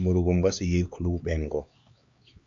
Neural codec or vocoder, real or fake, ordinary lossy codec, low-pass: codec, 16 kHz, 2 kbps, X-Codec, HuBERT features, trained on LibriSpeech; fake; MP3, 48 kbps; 7.2 kHz